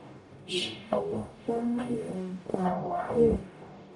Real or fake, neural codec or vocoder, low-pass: fake; codec, 44.1 kHz, 0.9 kbps, DAC; 10.8 kHz